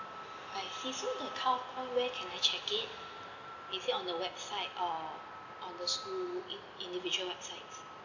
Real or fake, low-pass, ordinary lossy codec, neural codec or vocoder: real; 7.2 kHz; none; none